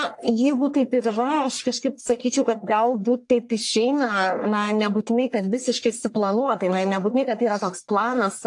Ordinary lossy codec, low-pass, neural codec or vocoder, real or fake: AAC, 64 kbps; 10.8 kHz; codec, 44.1 kHz, 1.7 kbps, Pupu-Codec; fake